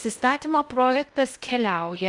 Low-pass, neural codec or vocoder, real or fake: 10.8 kHz; codec, 16 kHz in and 24 kHz out, 0.6 kbps, FocalCodec, streaming, 2048 codes; fake